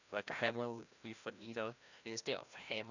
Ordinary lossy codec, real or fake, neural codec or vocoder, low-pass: none; fake; codec, 16 kHz, 1 kbps, FreqCodec, larger model; 7.2 kHz